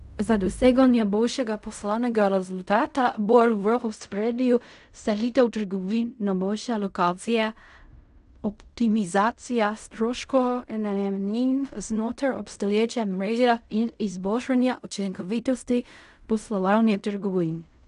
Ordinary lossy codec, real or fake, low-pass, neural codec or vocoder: none; fake; 10.8 kHz; codec, 16 kHz in and 24 kHz out, 0.4 kbps, LongCat-Audio-Codec, fine tuned four codebook decoder